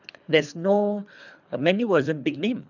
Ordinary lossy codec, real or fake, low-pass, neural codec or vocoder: none; fake; 7.2 kHz; codec, 24 kHz, 3 kbps, HILCodec